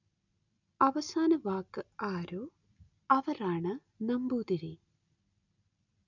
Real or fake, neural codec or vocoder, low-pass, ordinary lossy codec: real; none; 7.2 kHz; none